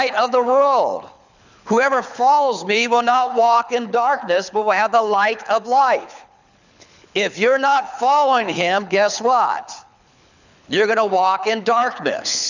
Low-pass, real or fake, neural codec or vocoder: 7.2 kHz; fake; codec, 24 kHz, 6 kbps, HILCodec